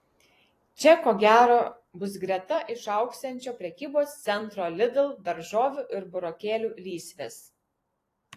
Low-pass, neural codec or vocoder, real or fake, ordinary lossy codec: 14.4 kHz; vocoder, 44.1 kHz, 128 mel bands every 256 samples, BigVGAN v2; fake; AAC, 48 kbps